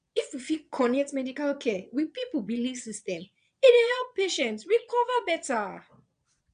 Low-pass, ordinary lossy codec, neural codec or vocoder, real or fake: 9.9 kHz; MP3, 96 kbps; vocoder, 22.05 kHz, 80 mel bands, Vocos; fake